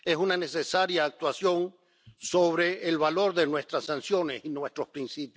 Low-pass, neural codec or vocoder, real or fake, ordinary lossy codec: none; none; real; none